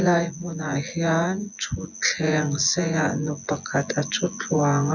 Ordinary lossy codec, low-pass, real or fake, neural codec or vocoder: none; 7.2 kHz; fake; vocoder, 24 kHz, 100 mel bands, Vocos